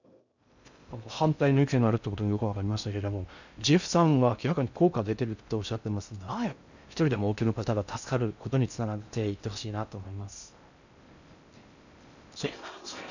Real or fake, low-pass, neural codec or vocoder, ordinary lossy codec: fake; 7.2 kHz; codec, 16 kHz in and 24 kHz out, 0.6 kbps, FocalCodec, streaming, 2048 codes; none